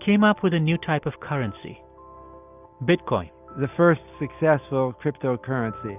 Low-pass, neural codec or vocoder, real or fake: 3.6 kHz; none; real